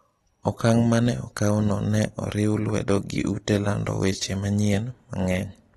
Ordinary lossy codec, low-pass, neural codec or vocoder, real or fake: AAC, 32 kbps; 19.8 kHz; none; real